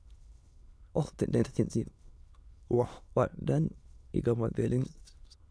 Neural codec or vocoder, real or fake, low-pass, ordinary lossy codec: autoencoder, 22.05 kHz, a latent of 192 numbers a frame, VITS, trained on many speakers; fake; none; none